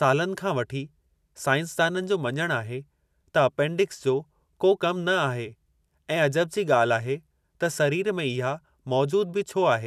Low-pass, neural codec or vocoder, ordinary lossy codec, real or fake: 14.4 kHz; vocoder, 44.1 kHz, 128 mel bands every 256 samples, BigVGAN v2; none; fake